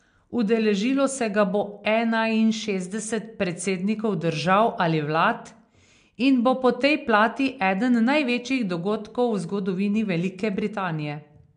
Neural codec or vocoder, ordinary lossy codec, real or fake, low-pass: none; MP3, 64 kbps; real; 9.9 kHz